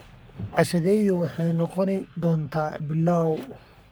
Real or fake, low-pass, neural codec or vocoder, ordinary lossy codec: fake; none; codec, 44.1 kHz, 3.4 kbps, Pupu-Codec; none